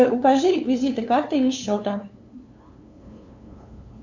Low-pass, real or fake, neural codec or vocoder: 7.2 kHz; fake; codec, 16 kHz, 2 kbps, FunCodec, trained on LibriTTS, 25 frames a second